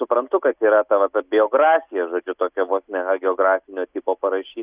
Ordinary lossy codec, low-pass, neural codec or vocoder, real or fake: Opus, 64 kbps; 3.6 kHz; none; real